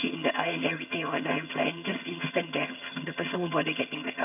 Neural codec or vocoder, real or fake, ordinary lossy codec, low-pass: vocoder, 22.05 kHz, 80 mel bands, HiFi-GAN; fake; none; 3.6 kHz